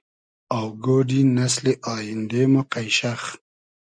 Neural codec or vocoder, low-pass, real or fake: none; 9.9 kHz; real